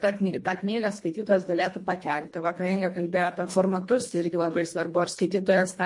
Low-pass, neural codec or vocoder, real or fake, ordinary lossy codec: 10.8 kHz; codec, 24 kHz, 1.5 kbps, HILCodec; fake; MP3, 48 kbps